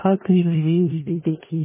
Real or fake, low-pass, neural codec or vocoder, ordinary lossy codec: fake; 3.6 kHz; codec, 16 kHz in and 24 kHz out, 0.4 kbps, LongCat-Audio-Codec, four codebook decoder; MP3, 16 kbps